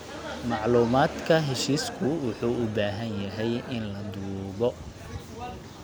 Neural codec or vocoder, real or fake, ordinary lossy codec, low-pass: none; real; none; none